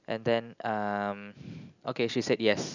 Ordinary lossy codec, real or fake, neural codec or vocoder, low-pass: none; real; none; 7.2 kHz